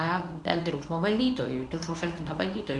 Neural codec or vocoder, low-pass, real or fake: codec, 24 kHz, 0.9 kbps, WavTokenizer, medium speech release version 1; 10.8 kHz; fake